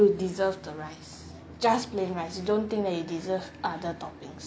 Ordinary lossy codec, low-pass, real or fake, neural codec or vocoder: none; none; real; none